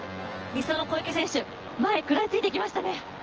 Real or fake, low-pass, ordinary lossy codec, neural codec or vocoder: fake; 7.2 kHz; Opus, 16 kbps; vocoder, 24 kHz, 100 mel bands, Vocos